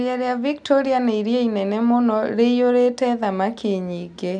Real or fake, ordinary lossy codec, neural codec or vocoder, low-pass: real; none; none; 9.9 kHz